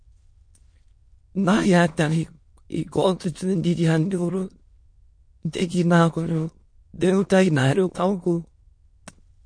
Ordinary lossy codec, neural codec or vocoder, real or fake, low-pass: MP3, 48 kbps; autoencoder, 22.05 kHz, a latent of 192 numbers a frame, VITS, trained on many speakers; fake; 9.9 kHz